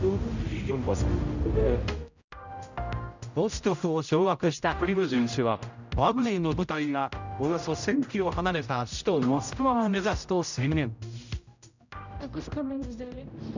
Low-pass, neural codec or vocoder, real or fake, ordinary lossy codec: 7.2 kHz; codec, 16 kHz, 0.5 kbps, X-Codec, HuBERT features, trained on general audio; fake; none